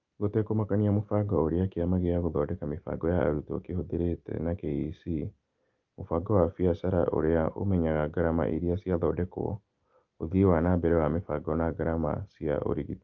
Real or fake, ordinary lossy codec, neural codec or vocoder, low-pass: real; Opus, 32 kbps; none; 7.2 kHz